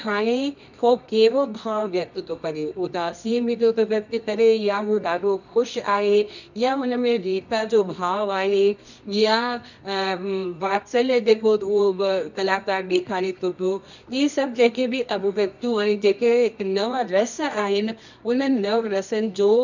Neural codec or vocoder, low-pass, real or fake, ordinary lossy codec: codec, 24 kHz, 0.9 kbps, WavTokenizer, medium music audio release; 7.2 kHz; fake; none